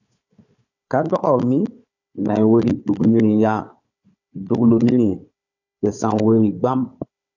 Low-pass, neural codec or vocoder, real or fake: 7.2 kHz; codec, 16 kHz, 4 kbps, FunCodec, trained on Chinese and English, 50 frames a second; fake